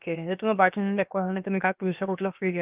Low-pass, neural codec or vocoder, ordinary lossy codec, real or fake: 3.6 kHz; codec, 16 kHz, about 1 kbps, DyCAST, with the encoder's durations; Opus, 24 kbps; fake